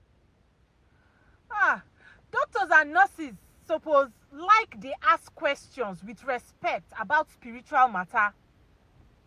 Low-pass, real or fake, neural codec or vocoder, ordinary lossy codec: 14.4 kHz; real; none; MP3, 64 kbps